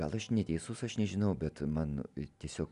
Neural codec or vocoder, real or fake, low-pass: none; real; 10.8 kHz